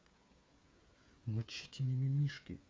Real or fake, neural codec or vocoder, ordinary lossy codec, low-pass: fake; codec, 16 kHz, 8 kbps, FreqCodec, smaller model; none; none